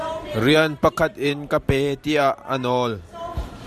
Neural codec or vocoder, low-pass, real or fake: vocoder, 44.1 kHz, 128 mel bands every 512 samples, BigVGAN v2; 14.4 kHz; fake